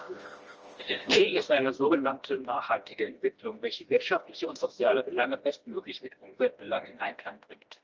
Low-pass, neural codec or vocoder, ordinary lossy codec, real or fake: 7.2 kHz; codec, 16 kHz, 1 kbps, FreqCodec, smaller model; Opus, 24 kbps; fake